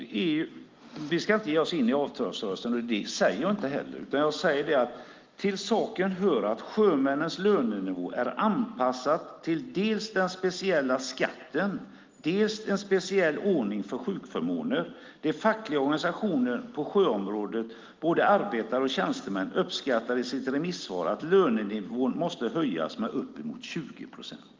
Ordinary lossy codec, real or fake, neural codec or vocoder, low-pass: Opus, 32 kbps; real; none; 7.2 kHz